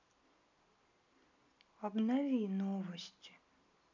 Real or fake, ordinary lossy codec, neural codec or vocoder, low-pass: real; none; none; 7.2 kHz